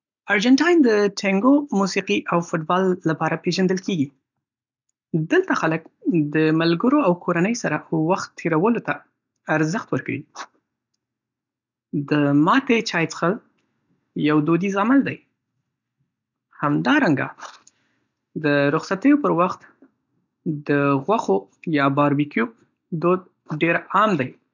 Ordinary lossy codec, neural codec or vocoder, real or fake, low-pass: none; none; real; 7.2 kHz